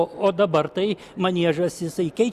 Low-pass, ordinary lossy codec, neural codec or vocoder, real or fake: 14.4 kHz; Opus, 64 kbps; none; real